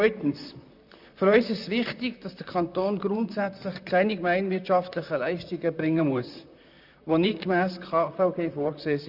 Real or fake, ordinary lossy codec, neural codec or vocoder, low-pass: fake; none; vocoder, 44.1 kHz, 128 mel bands, Pupu-Vocoder; 5.4 kHz